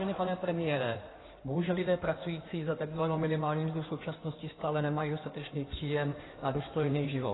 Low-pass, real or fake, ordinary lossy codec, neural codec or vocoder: 7.2 kHz; fake; AAC, 16 kbps; codec, 16 kHz in and 24 kHz out, 2.2 kbps, FireRedTTS-2 codec